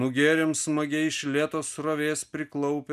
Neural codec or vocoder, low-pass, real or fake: none; 14.4 kHz; real